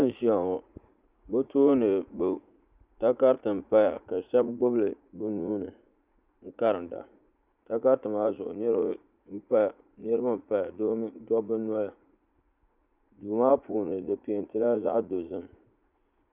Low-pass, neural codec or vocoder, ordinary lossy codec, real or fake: 3.6 kHz; vocoder, 44.1 kHz, 80 mel bands, Vocos; Opus, 64 kbps; fake